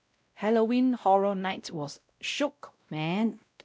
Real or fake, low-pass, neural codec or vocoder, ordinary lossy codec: fake; none; codec, 16 kHz, 0.5 kbps, X-Codec, WavLM features, trained on Multilingual LibriSpeech; none